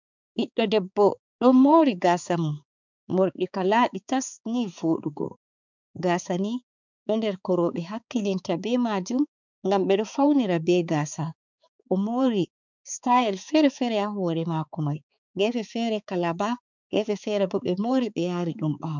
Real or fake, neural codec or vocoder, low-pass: fake; codec, 16 kHz, 4 kbps, X-Codec, HuBERT features, trained on balanced general audio; 7.2 kHz